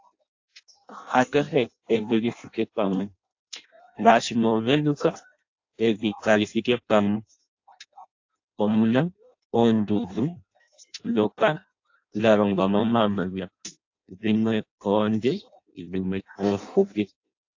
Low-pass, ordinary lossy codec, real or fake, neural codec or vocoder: 7.2 kHz; AAC, 48 kbps; fake; codec, 16 kHz in and 24 kHz out, 0.6 kbps, FireRedTTS-2 codec